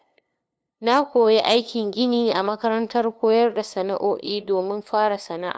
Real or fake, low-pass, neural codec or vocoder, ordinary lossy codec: fake; none; codec, 16 kHz, 2 kbps, FunCodec, trained on LibriTTS, 25 frames a second; none